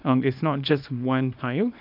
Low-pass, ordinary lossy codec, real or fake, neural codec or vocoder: 5.4 kHz; none; fake; codec, 24 kHz, 0.9 kbps, WavTokenizer, small release